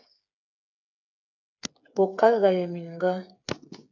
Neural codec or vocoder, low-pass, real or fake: codec, 16 kHz, 8 kbps, FreqCodec, smaller model; 7.2 kHz; fake